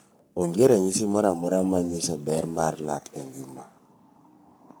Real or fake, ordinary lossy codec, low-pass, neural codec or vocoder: fake; none; none; codec, 44.1 kHz, 3.4 kbps, Pupu-Codec